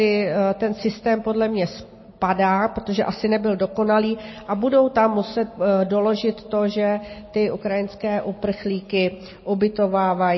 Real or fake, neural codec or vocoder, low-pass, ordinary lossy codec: real; none; 7.2 kHz; MP3, 24 kbps